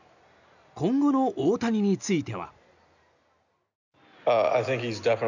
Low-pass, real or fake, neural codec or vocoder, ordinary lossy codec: 7.2 kHz; real; none; none